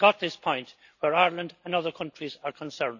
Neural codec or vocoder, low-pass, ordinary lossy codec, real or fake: none; 7.2 kHz; none; real